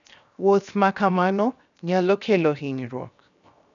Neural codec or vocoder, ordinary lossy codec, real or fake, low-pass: codec, 16 kHz, 0.7 kbps, FocalCodec; none; fake; 7.2 kHz